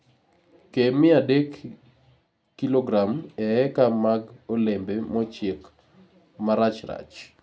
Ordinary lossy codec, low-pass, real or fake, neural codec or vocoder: none; none; real; none